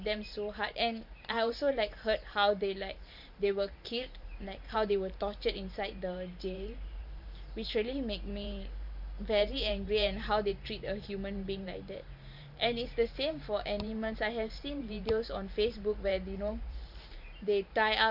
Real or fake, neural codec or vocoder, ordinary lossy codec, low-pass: fake; vocoder, 44.1 kHz, 128 mel bands every 512 samples, BigVGAN v2; none; 5.4 kHz